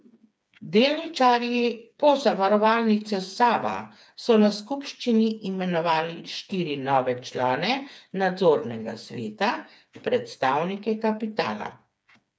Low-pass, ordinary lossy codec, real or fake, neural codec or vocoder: none; none; fake; codec, 16 kHz, 4 kbps, FreqCodec, smaller model